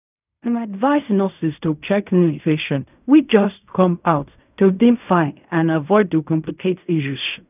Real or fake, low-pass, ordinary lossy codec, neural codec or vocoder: fake; 3.6 kHz; none; codec, 16 kHz in and 24 kHz out, 0.4 kbps, LongCat-Audio-Codec, fine tuned four codebook decoder